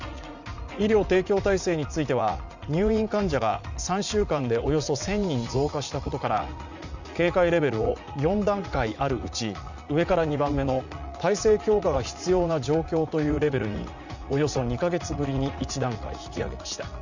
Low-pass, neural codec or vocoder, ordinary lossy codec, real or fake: 7.2 kHz; vocoder, 44.1 kHz, 80 mel bands, Vocos; none; fake